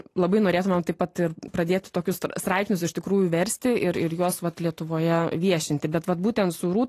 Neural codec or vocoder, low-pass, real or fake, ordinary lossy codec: none; 14.4 kHz; real; AAC, 48 kbps